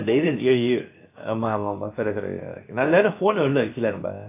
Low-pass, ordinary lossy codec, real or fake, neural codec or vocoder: 3.6 kHz; AAC, 24 kbps; fake; codec, 16 kHz, about 1 kbps, DyCAST, with the encoder's durations